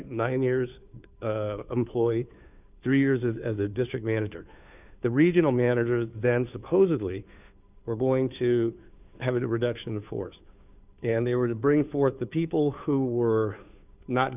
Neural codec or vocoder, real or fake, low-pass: codec, 16 kHz, 2 kbps, FunCodec, trained on Chinese and English, 25 frames a second; fake; 3.6 kHz